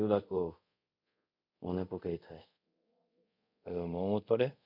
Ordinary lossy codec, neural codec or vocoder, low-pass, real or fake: none; codec, 24 kHz, 0.5 kbps, DualCodec; 5.4 kHz; fake